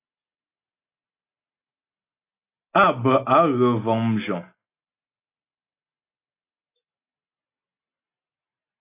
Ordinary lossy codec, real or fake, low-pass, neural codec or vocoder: AAC, 24 kbps; real; 3.6 kHz; none